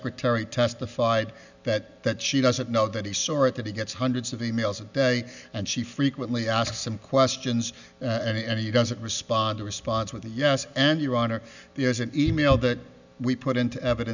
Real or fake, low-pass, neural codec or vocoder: real; 7.2 kHz; none